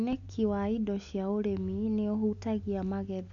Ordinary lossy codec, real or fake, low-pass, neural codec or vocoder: none; real; 7.2 kHz; none